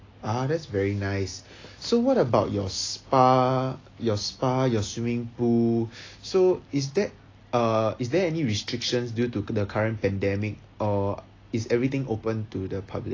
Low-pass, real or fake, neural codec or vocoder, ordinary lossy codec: 7.2 kHz; real; none; AAC, 32 kbps